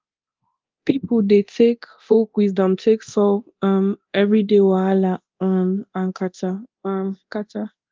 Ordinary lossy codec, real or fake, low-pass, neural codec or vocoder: Opus, 24 kbps; fake; 7.2 kHz; codec, 24 kHz, 0.9 kbps, DualCodec